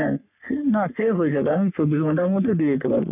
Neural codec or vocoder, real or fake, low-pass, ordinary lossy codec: codec, 44.1 kHz, 3.4 kbps, Pupu-Codec; fake; 3.6 kHz; none